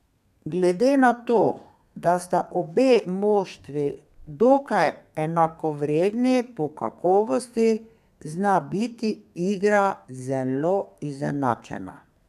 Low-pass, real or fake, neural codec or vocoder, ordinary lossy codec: 14.4 kHz; fake; codec, 32 kHz, 1.9 kbps, SNAC; none